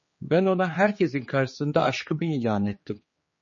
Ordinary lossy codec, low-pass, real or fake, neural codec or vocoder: MP3, 32 kbps; 7.2 kHz; fake; codec, 16 kHz, 4 kbps, X-Codec, HuBERT features, trained on general audio